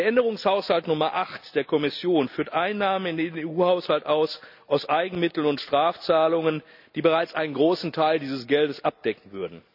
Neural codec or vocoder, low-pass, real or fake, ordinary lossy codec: none; 5.4 kHz; real; none